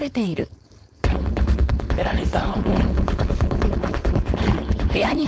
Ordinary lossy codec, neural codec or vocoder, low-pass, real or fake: none; codec, 16 kHz, 4.8 kbps, FACodec; none; fake